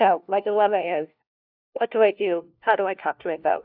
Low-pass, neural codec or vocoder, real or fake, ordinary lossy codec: 5.4 kHz; codec, 16 kHz, 1 kbps, FunCodec, trained on LibriTTS, 50 frames a second; fake; AAC, 48 kbps